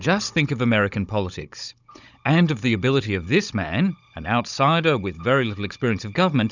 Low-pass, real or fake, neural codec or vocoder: 7.2 kHz; fake; codec, 16 kHz, 16 kbps, FunCodec, trained on Chinese and English, 50 frames a second